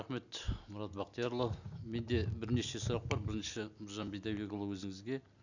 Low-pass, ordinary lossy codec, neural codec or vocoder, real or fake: 7.2 kHz; none; none; real